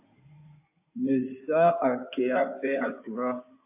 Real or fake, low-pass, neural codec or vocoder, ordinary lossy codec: fake; 3.6 kHz; codec, 16 kHz in and 24 kHz out, 2.2 kbps, FireRedTTS-2 codec; AAC, 32 kbps